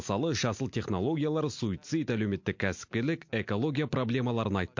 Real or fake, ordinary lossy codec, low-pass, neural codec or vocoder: real; MP3, 48 kbps; 7.2 kHz; none